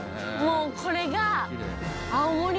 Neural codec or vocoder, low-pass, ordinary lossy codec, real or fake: none; none; none; real